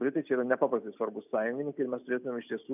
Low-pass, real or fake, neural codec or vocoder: 3.6 kHz; real; none